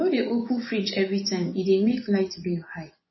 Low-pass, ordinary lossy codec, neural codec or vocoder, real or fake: 7.2 kHz; MP3, 24 kbps; vocoder, 24 kHz, 100 mel bands, Vocos; fake